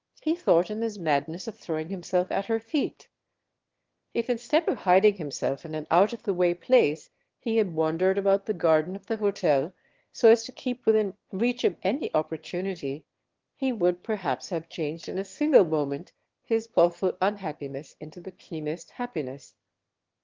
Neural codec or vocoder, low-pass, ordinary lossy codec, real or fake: autoencoder, 22.05 kHz, a latent of 192 numbers a frame, VITS, trained on one speaker; 7.2 kHz; Opus, 16 kbps; fake